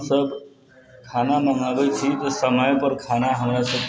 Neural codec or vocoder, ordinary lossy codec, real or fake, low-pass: none; none; real; none